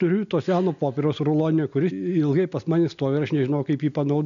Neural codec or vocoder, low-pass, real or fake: none; 7.2 kHz; real